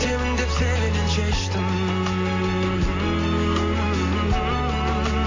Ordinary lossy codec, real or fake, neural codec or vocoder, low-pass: AAC, 32 kbps; real; none; 7.2 kHz